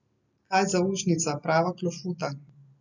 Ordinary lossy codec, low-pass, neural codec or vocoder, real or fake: none; 7.2 kHz; none; real